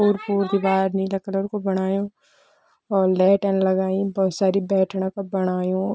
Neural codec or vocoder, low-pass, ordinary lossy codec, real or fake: none; none; none; real